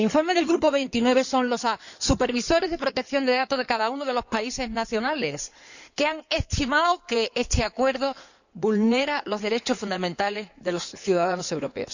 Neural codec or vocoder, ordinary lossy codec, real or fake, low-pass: codec, 16 kHz in and 24 kHz out, 2.2 kbps, FireRedTTS-2 codec; none; fake; 7.2 kHz